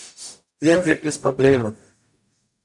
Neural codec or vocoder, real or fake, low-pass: codec, 44.1 kHz, 0.9 kbps, DAC; fake; 10.8 kHz